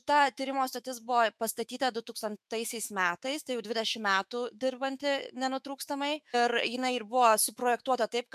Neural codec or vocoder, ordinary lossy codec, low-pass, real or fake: autoencoder, 48 kHz, 128 numbers a frame, DAC-VAE, trained on Japanese speech; MP3, 96 kbps; 14.4 kHz; fake